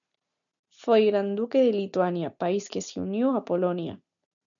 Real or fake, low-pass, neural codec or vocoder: real; 7.2 kHz; none